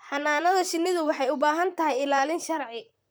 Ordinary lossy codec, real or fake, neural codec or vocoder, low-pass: none; fake; vocoder, 44.1 kHz, 128 mel bands, Pupu-Vocoder; none